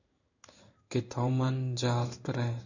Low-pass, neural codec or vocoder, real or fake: 7.2 kHz; codec, 16 kHz in and 24 kHz out, 1 kbps, XY-Tokenizer; fake